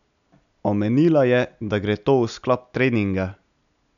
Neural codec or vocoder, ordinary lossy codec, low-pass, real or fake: none; none; 7.2 kHz; real